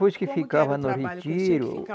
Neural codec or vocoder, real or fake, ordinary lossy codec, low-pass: none; real; none; none